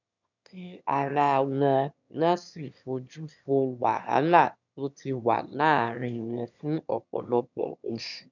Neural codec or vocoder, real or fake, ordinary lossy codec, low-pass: autoencoder, 22.05 kHz, a latent of 192 numbers a frame, VITS, trained on one speaker; fake; none; 7.2 kHz